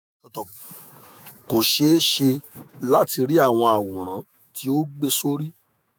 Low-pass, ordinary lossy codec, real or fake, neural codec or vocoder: none; none; fake; autoencoder, 48 kHz, 128 numbers a frame, DAC-VAE, trained on Japanese speech